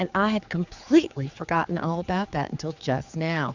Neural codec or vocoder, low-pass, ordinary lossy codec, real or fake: codec, 16 kHz, 4 kbps, X-Codec, HuBERT features, trained on general audio; 7.2 kHz; Opus, 64 kbps; fake